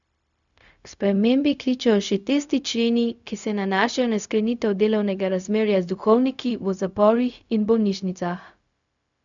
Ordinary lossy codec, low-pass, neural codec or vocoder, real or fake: none; 7.2 kHz; codec, 16 kHz, 0.4 kbps, LongCat-Audio-Codec; fake